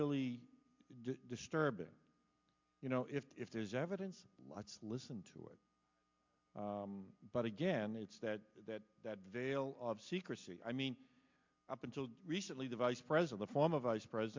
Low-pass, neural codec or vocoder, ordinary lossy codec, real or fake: 7.2 kHz; none; MP3, 64 kbps; real